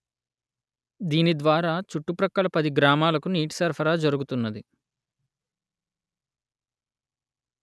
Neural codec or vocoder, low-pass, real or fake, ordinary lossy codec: none; none; real; none